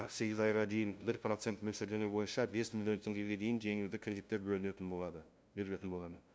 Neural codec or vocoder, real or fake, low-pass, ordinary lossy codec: codec, 16 kHz, 0.5 kbps, FunCodec, trained on LibriTTS, 25 frames a second; fake; none; none